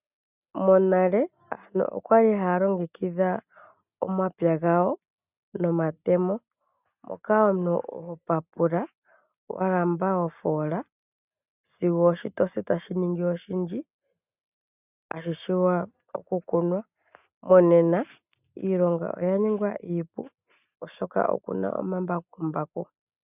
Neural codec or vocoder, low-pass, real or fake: none; 3.6 kHz; real